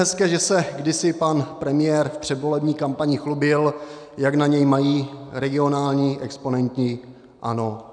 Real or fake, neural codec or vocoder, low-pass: real; none; 9.9 kHz